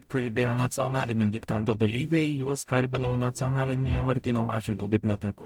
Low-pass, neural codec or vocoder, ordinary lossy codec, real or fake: 19.8 kHz; codec, 44.1 kHz, 0.9 kbps, DAC; MP3, 96 kbps; fake